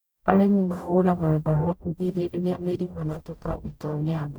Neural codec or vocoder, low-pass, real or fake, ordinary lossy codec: codec, 44.1 kHz, 0.9 kbps, DAC; none; fake; none